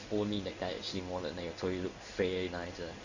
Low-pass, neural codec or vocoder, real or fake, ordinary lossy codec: 7.2 kHz; codec, 16 kHz in and 24 kHz out, 1 kbps, XY-Tokenizer; fake; Opus, 64 kbps